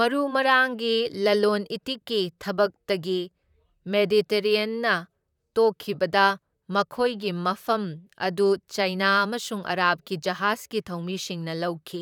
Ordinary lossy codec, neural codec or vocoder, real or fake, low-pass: none; vocoder, 44.1 kHz, 128 mel bands, Pupu-Vocoder; fake; 19.8 kHz